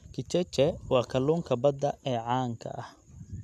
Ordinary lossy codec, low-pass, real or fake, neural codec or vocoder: none; 14.4 kHz; real; none